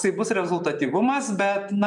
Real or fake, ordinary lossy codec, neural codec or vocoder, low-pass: real; MP3, 96 kbps; none; 10.8 kHz